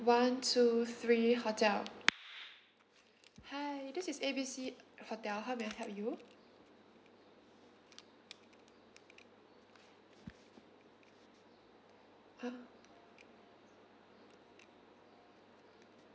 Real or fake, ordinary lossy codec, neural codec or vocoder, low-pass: real; none; none; none